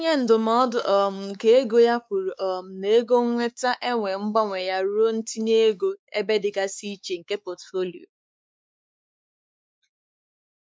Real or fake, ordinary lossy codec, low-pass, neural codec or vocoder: fake; none; none; codec, 16 kHz, 4 kbps, X-Codec, WavLM features, trained on Multilingual LibriSpeech